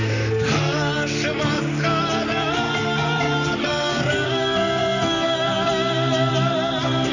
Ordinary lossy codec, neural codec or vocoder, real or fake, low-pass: none; vocoder, 44.1 kHz, 128 mel bands, Pupu-Vocoder; fake; 7.2 kHz